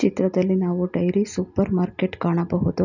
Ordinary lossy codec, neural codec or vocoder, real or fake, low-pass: none; none; real; 7.2 kHz